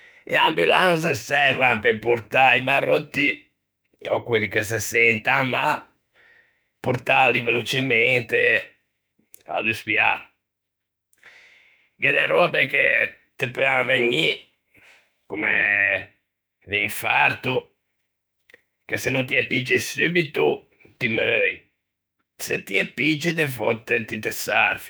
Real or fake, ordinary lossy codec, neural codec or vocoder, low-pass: fake; none; autoencoder, 48 kHz, 32 numbers a frame, DAC-VAE, trained on Japanese speech; none